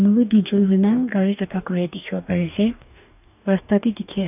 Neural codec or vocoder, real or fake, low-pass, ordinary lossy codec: codec, 44.1 kHz, 2.6 kbps, DAC; fake; 3.6 kHz; none